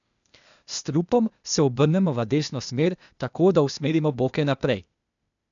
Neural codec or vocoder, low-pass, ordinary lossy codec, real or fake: codec, 16 kHz, 0.8 kbps, ZipCodec; 7.2 kHz; none; fake